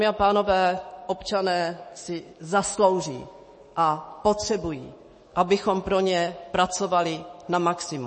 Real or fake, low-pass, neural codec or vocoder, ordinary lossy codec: fake; 10.8 kHz; autoencoder, 48 kHz, 128 numbers a frame, DAC-VAE, trained on Japanese speech; MP3, 32 kbps